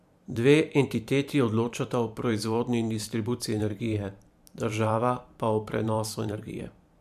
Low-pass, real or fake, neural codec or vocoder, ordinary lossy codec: 14.4 kHz; fake; vocoder, 44.1 kHz, 128 mel bands every 512 samples, BigVGAN v2; MP3, 96 kbps